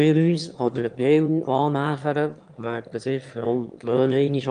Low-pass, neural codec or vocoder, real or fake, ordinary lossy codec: 9.9 kHz; autoencoder, 22.05 kHz, a latent of 192 numbers a frame, VITS, trained on one speaker; fake; Opus, 32 kbps